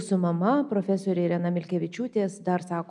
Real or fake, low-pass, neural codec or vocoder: real; 10.8 kHz; none